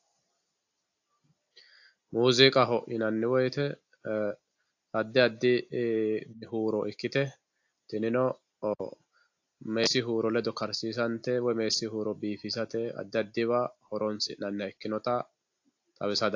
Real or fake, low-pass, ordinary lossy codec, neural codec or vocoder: real; 7.2 kHz; AAC, 48 kbps; none